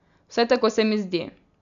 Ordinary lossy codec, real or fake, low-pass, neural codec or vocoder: none; real; 7.2 kHz; none